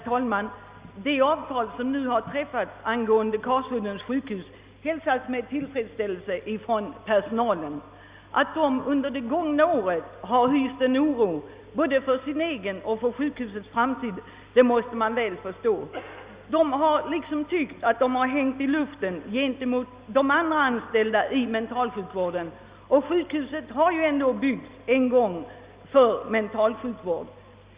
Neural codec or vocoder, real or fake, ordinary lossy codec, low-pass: none; real; Opus, 64 kbps; 3.6 kHz